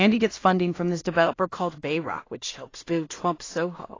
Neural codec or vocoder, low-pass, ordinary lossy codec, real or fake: codec, 16 kHz in and 24 kHz out, 0.4 kbps, LongCat-Audio-Codec, two codebook decoder; 7.2 kHz; AAC, 32 kbps; fake